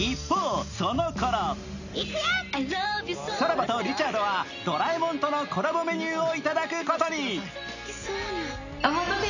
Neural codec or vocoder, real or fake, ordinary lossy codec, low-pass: none; real; none; 7.2 kHz